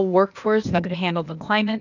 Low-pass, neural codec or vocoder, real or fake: 7.2 kHz; codec, 16 kHz, 0.8 kbps, ZipCodec; fake